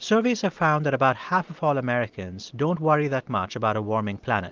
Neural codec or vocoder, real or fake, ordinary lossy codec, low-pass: none; real; Opus, 24 kbps; 7.2 kHz